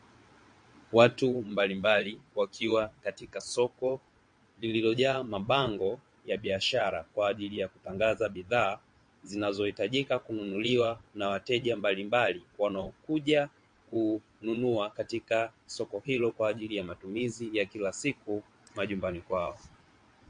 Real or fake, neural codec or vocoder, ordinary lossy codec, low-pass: fake; vocoder, 22.05 kHz, 80 mel bands, WaveNeXt; MP3, 48 kbps; 9.9 kHz